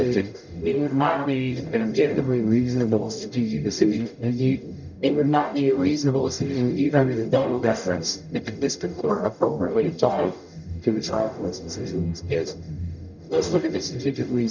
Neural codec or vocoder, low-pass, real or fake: codec, 44.1 kHz, 0.9 kbps, DAC; 7.2 kHz; fake